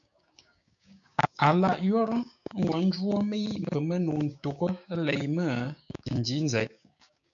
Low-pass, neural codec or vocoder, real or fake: 7.2 kHz; codec, 16 kHz, 6 kbps, DAC; fake